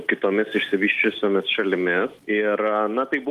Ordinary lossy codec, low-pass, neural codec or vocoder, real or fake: Opus, 24 kbps; 14.4 kHz; none; real